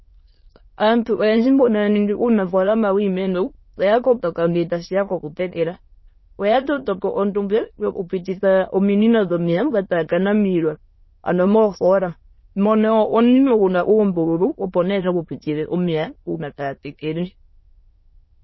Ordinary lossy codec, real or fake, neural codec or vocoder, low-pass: MP3, 24 kbps; fake; autoencoder, 22.05 kHz, a latent of 192 numbers a frame, VITS, trained on many speakers; 7.2 kHz